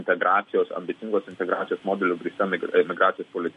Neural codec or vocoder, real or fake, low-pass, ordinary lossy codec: none; real; 14.4 kHz; MP3, 48 kbps